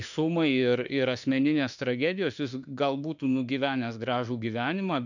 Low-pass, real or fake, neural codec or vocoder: 7.2 kHz; fake; autoencoder, 48 kHz, 32 numbers a frame, DAC-VAE, trained on Japanese speech